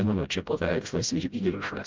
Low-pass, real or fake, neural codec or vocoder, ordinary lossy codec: 7.2 kHz; fake; codec, 16 kHz, 0.5 kbps, FreqCodec, smaller model; Opus, 32 kbps